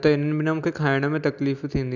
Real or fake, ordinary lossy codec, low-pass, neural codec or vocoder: real; none; 7.2 kHz; none